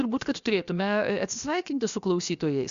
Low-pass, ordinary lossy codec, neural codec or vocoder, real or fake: 7.2 kHz; Opus, 64 kbps; codec, 16 kHz, 0.7 kbps, FocalCodec; fake